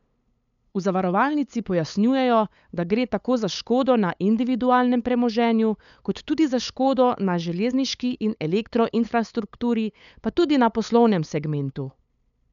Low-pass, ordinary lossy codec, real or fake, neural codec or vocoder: 7.2 kHz; none; fake; codec, 16 kHz, 8 kbps, FunCodec, trained on LibriTTS, 25 frames a second